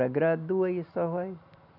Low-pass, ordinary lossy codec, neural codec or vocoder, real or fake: 5.4 kHz; none; none; real